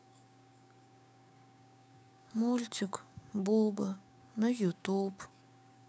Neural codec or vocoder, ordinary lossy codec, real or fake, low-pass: codec, 16 kHz, 6 kbps, DAC; none; fake; none